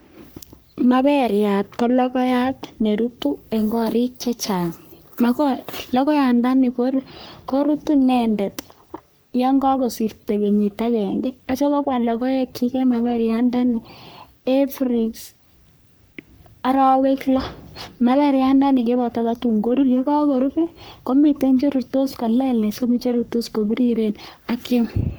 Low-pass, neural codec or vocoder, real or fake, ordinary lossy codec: none; codec, 44.1 kHz, 3.4 kbps, Pupu-Codec; fake; none